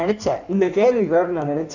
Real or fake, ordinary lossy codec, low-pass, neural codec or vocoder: fake; none; 7.2 kHz; codec, 16 kHz in and 24 kHz out, 1.1 kbps, FireRedTTS-2 codec